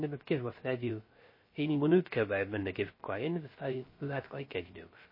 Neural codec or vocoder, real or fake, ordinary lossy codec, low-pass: codec, 16 kHz, 0.3 kbps, FocalCodec; fake; MP3, 24 kbps; 5.4 kHz